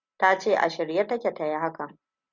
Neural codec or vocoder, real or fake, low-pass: none; real; 7.2 kHz